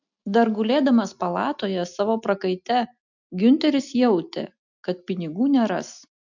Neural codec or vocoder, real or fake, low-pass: none; real; 7.2 kHz